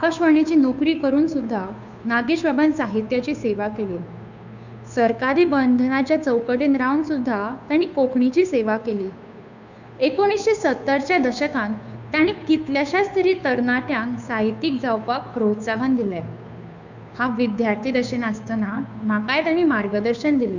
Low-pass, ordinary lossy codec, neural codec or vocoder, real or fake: 7.2 kHz; none; codec, 16 kHz, 2 kbps, FunCodec, trained on Chinese and English, 25 frames a second; fake